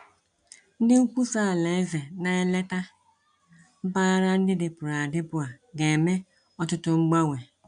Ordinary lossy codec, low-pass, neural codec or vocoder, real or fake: none; 9.9 kHz; none; real